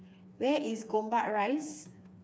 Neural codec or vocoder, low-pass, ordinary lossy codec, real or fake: codec, 16 kHz, 4 kbps, FreqCodec, smaller model; none; none; fake